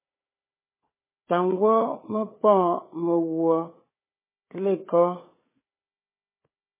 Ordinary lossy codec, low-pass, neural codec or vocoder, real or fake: MP3, 16 kbps; 3.6 kHz; codec, 16 kHz, 4 kbps, FunCodec, trained on Chinese and English, 50 frames a second; fake